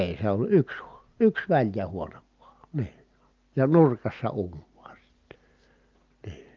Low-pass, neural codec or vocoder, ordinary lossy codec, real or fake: 7.2 kHz; none; Opus, 24 kbps; real